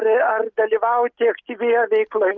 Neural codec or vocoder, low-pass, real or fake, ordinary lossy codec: none; 7.2 kHz; real; Opus, 32 kbps